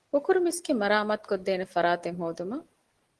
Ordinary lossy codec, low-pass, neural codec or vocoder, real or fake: Opus, 16 kbps; 10.8 kHz; none; real